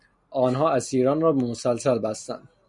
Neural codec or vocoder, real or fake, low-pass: none; real; 10.8 kHz